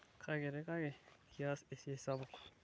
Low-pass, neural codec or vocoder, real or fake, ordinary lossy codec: none; none; real; none